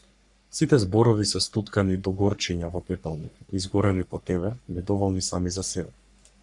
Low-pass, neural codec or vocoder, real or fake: 10.8 kHz; codec, 44.1 kHz, 3.4 kbps, Pupu-Codec; fake